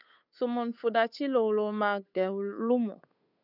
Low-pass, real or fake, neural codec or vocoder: 5.4 kHz; fake; codec, 16 kHz, 8 kbps, FunCodec, trained on Chinese and English, 25 frames a second